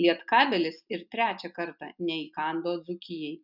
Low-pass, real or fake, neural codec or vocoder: 5.4 kHz; real; none